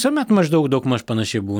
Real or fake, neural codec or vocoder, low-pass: real; none; 19.8 kHz